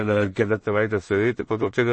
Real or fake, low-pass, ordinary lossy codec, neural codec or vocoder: fake; 9.9 kHz; MP3, 32 kbps; codec, 16 kHz in and 24 kHz out, 0.4 kbps, LongCat-Audio-Codec, two codebook decoder